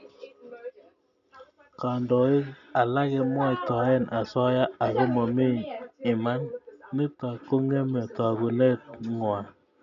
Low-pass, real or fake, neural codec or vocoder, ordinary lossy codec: 7.2 kHz; real; none; none